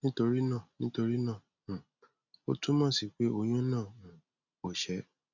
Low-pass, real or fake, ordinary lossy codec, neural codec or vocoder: 7.2 kHz; real; none; none